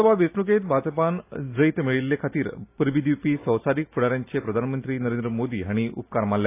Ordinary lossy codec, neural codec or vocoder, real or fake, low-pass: AAC, 24 kbps; none; real; 3.6 kHz